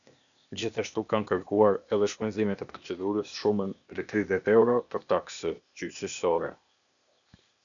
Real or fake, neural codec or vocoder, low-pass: fake; codec, 16 kHz, 0.8 kbps, ZipCodec; 7.2 kHz